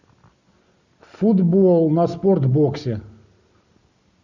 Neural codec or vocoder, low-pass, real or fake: none; 7.2 kHz; real